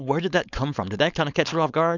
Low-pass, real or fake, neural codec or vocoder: 7.2 kHz; fake; codec, 16 kHz, 4.8 kbps, FACodec